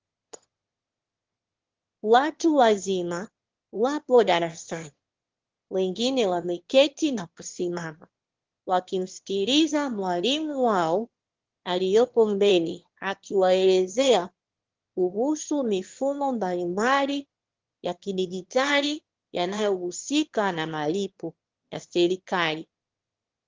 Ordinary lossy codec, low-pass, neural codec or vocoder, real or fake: Opus, 16 kbps; 7.2 kHz; autoencoder, 22.05 kHz, a latent of 192 numbers a frame, VITS, trained on one speaker; fake